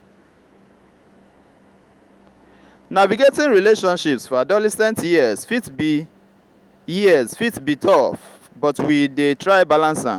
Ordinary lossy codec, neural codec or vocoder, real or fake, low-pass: Opus, 32 kbps; autoencoder, 48 kHz, 128 numbers a frame, DAC-VAE, trained on Japanese speech; fake; 19.8 kHz